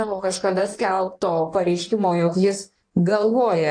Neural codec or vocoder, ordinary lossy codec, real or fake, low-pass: codec, 16 kHz in and 24 kHz out, 1.1 kbps, FireRedTTS-2 codec; AAC, 64 kbps; fake; 9.9 kHz